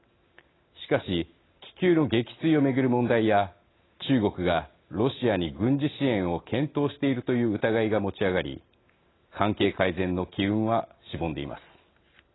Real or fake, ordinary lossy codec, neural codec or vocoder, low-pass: real; AAC, 16 kbps; none; 7.2 kHz